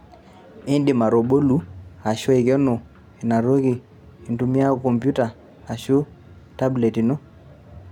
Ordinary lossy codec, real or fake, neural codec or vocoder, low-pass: none; real; none; 19.8 kHz